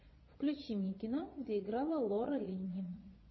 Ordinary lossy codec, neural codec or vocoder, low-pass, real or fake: MP3, 24 kbps; vocoder, 22.05 kHz, 80 mel bands, Vocos; 7.2 kHz; fake